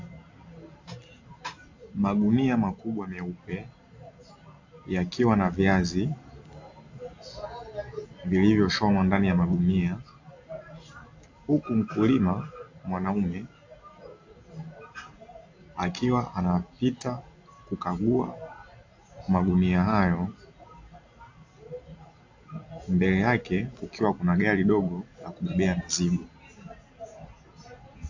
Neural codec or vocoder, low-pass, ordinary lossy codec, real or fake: none; 7.2 kHz; AAC, 48 kbps; real